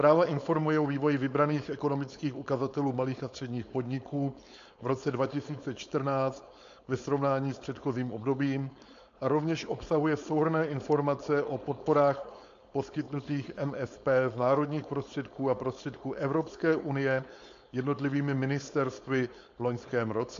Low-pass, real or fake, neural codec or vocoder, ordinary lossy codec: 7.2 kHz; fake; codec, 16 kHz, 4.8 kbps, FACodec; AAC, 64 kbps